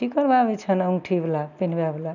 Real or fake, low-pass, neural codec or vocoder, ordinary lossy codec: real; 7.2 kHz; none; none